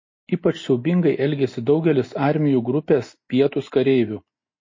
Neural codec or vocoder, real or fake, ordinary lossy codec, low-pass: none; real; MP3, 32 kbps; 7.2 kHz